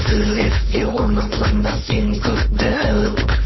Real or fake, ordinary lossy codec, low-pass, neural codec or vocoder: fake; MP3, 24 kbps; 7.2 kHz; codec, 16 kHz, 4.8 kbps, FACodec